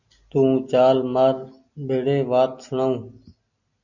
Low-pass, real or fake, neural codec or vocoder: 7.2 kHz; real; none